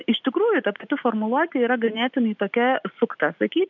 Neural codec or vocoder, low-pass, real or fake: none; 7.2 kHz; real